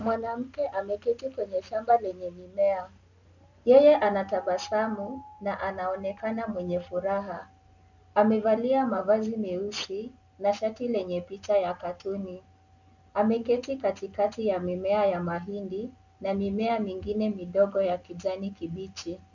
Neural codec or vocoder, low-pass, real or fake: none; 7.2 kHz; real